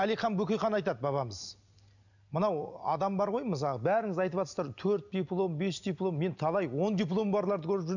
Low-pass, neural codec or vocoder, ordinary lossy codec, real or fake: 7.2 kHz; none; none; real